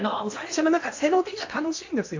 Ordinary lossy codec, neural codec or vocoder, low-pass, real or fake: AAC, 48 kbps; codec, 16 kHz in and 24 kHz out, 0.8 kbps, FocalCodec, streaming, 65536 codes; 7.2 kHz; fake